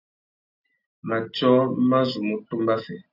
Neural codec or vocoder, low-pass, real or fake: none; 5.4 kHz; real